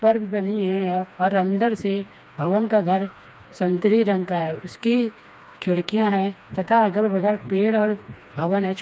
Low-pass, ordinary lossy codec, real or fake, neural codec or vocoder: none; none; fake; codec, 16 kHz, 2 kbps, FreqCodec, smaller model